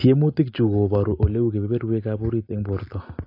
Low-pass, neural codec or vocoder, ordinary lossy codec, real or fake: 5.4 kHz; none; AAC, 32 kbps; real